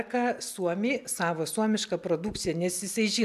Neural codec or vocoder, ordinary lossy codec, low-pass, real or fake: none; AAC, 96 kbps; 14.4 kHz; real